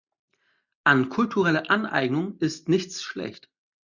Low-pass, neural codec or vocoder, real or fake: 7.2 kHz; none; real